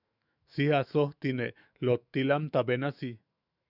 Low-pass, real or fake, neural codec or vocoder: 5.4 kHz; fake; autoencoder, 48 kHz, 128 numbers a frame, DAC-VAE, trained on Japanese speech